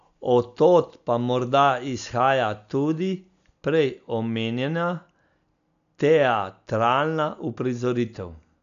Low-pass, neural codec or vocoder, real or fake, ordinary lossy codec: 7.2 kHz; none; real; none